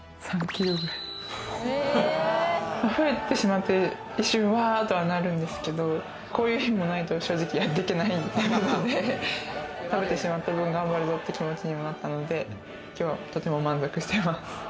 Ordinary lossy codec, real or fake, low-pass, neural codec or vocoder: none; real; none; none